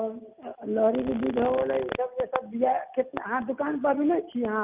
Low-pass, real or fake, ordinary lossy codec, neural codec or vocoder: 3.6 kHz; real; Opus, 24 kbps; none